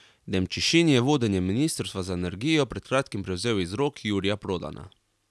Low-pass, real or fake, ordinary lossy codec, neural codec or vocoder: none; real; none; none